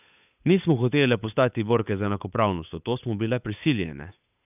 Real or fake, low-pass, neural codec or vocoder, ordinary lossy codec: fake; 3.6 kHz; codec, 16 kHz, 8 kbps, FunCodec, trained on Chinese and English, 25 frames a second; none